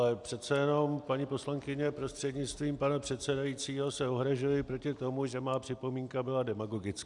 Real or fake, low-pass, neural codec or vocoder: real; 10.8 kHz; none